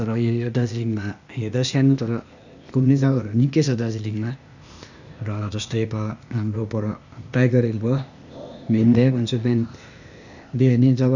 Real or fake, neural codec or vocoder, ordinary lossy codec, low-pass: fake; codec, 16 kHz, 0.8 kbps, ZipCodec; none; 7.2 kHz